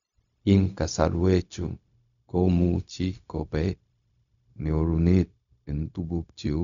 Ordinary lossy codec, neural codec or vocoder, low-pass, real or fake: none; codec, 16 kHz, 0.4 kbps, LongCat-Audio-Codec; 7.2 kHz; fake